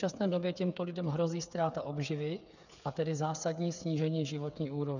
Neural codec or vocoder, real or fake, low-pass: codec, 16 kHz, 8 kbps, FreqCodec, smaller model; fake; 7.2 kHz